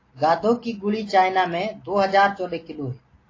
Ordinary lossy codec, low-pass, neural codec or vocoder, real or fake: AAC, 32 kbps; 7.2 kHz; none; real